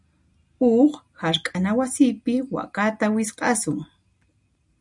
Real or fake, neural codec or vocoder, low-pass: real; none; 10.8 kHz